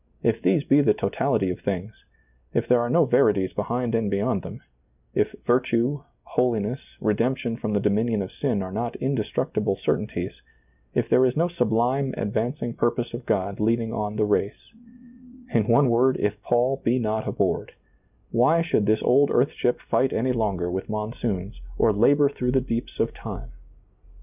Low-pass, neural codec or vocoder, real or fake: 3.6 kHz; none; real